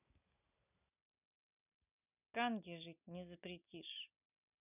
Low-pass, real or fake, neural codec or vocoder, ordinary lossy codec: 3.6 kHz; real; none; none